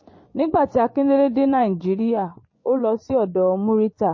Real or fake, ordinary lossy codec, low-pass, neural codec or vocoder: fake; MP3, 32 kbps; 7.2 kHz; vocoder, 24 kHz, 100 mel bands, Vocos